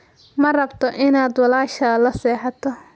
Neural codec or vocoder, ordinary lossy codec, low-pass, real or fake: none; none; none; real